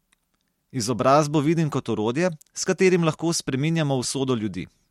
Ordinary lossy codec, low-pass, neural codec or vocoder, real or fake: MP3, 64 kbps; 19.8 kHz; none; real